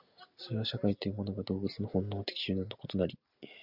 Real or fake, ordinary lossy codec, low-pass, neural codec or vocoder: real; Opus, 64 kbps; 5.4 kHz; none